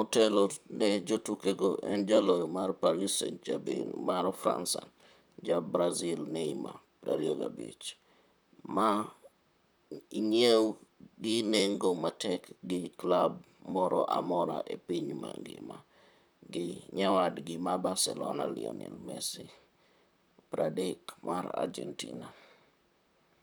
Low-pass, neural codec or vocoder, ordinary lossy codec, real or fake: none; vocoder, 44.1 kHz, 128 mel bands, Pupu-Vocoder; none; fake